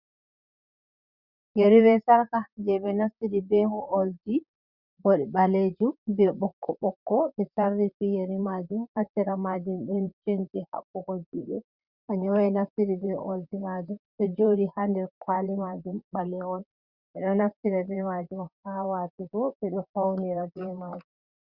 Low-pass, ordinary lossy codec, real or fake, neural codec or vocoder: 5.4 kHz; Opus, 64 kbps; fake; vocoder, 44.1 kHz, 128 mel bands, Pupu-Vocoder